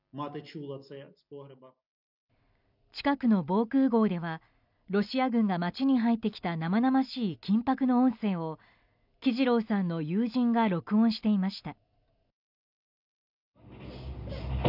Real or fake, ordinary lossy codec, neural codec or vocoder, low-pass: real; none; none; 5.4 kHz